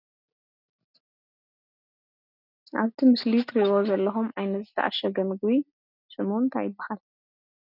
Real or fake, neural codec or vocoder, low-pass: real; none; 5.4 kHz